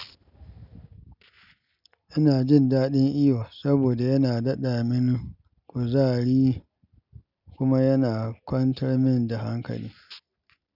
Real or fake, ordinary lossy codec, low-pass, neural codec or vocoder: real; none; 5.4 kHz; none